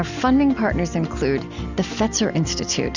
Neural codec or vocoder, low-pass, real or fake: none; 7.2 kHz; real